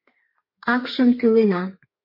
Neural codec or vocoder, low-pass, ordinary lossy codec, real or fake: codec, 32 kHz, 1.9 kbps, SNAC; 5.4 kHz; MP3, 32 kbps; fake